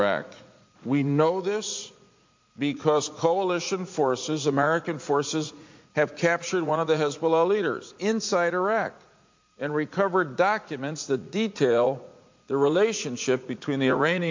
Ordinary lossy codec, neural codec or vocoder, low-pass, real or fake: MP3, 48 kbps; vocoder, 44.1 kHz, 80 mel bands, Vocos; 7.2 kHz; fake